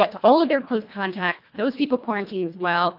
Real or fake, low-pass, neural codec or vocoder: fake; 5.4 kHz; codec, 24 kHz, 1.5 kbps, HILCodec